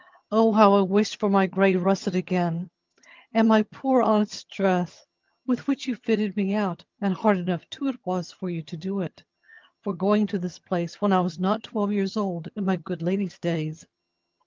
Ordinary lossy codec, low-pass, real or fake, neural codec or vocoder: Opus, 24 kbps; 7.2 kHz; fake; vocoder, 22.05 kHz, 80 mel bands, HiFi-GAN